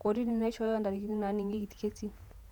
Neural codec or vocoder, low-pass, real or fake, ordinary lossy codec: vocoder, 48 kHz, 128 mel bands, Vocos; 19.8 kHz; fake; none